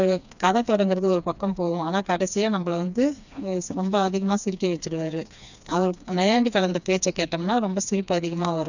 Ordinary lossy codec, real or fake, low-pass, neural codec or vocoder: none; fake; 7.2 kHz; codec, 16 kHz, 2 kbps, FreqCodec, smaller model